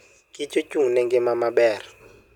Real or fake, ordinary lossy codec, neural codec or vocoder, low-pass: real; none; none; 19.8 kHz